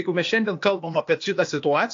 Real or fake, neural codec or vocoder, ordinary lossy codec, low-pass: fake; codec, 16 kHz, 0.8 kbps, ZipCodec; AAC, 48 kbps; 7.2 kHz